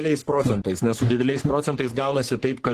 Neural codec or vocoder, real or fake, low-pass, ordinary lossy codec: codec, 44.1 kHz, 3.4 kbps, Pupu-Codec; fake; 14.4 kHz; Opus, 16 kbps